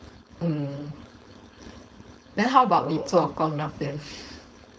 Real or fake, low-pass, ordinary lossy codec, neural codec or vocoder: fake; none; none; codec, 16 kHz, 4.8 kbps, FACodec